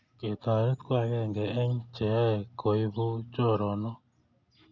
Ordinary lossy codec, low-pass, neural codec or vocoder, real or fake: none; 7.2 kHz; none; real